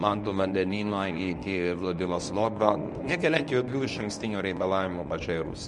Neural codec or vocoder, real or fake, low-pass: codec, 24 kHz, 0.9 kbps, WavTokenizer, medium speech release version 1; fake; 10.8 kHz